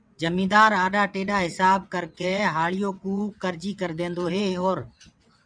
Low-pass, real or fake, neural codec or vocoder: 9.9 kHz; fake; vocoder, 22.05 kHz, 80 mel bands, WaveNeXt